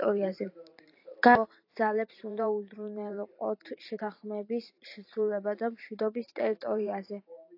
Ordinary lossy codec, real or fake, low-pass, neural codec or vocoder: AAC, 32 kbps; fake; 5.4 kHz; vocoder, 44.1 kHz, 128 mel bands every 512 samples, BigVGAN v2